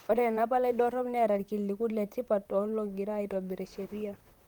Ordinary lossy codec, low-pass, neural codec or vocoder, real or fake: Opus, 32 kbps; 19.8 kHz; vocoder, 44.1 kHz, 128 mel bands, Pupu-Vocoder; fake